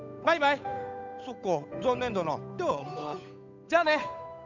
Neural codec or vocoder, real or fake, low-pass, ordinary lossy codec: codec, 16 kHz, 8 kbps, FunCodec, trained on Chinese and English, 25 frames a second; fake; 7.2 kHz; none